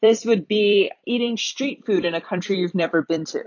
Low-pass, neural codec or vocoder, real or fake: 7.2 kHz; vocoder, 44.1 kHz, 128 mel bands every 256 samples, BigVGAN v2; fake